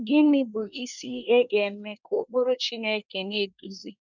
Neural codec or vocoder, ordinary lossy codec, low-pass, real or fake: codec, 24 kHz, 1 kbps, SNAC; none; 7.2 kHz; fake